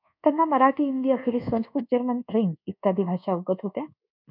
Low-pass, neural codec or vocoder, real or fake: 5.4 kHz; codec, 24 kHz, 1.2 kbps, DualCodec; fake